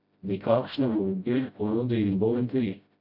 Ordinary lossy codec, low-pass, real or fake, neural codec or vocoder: none; 5.4 kHz; fake; codec, 16 kHz, 0.5 kbps, FreqCodec, smaller model